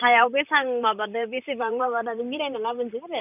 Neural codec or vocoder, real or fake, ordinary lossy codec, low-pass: vocoder, 44.1 kHz, 128 mel bands, Pupu-Vocoder; fake; none; 3.6 kHz